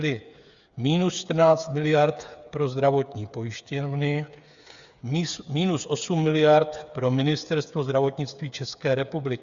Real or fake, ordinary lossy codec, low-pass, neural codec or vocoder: fake; Opus, 64 kbps; 7.2 kHz; codec, 16 kHz, 8 kbps, FreqCodec, smaller model